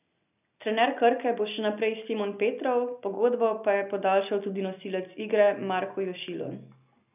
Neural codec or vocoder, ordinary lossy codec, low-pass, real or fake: none; none; 3.6 kHz; real